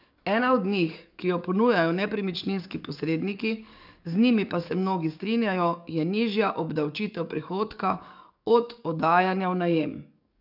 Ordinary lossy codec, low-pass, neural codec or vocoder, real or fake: none; 5.4 kHz; codec, 44.1 kHz, 7.8 kbps, DAC; fake